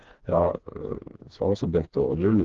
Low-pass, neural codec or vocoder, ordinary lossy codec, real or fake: 7.2 kHz; codec, 16 kHz, 2 kbps, FreqCodec, smaller model; Opus, 16 kbps; fake